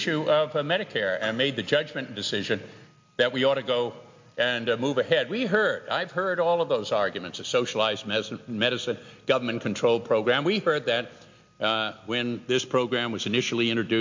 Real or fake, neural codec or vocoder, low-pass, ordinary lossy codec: real; none; 7.2 kHz; MP3, 48 kbps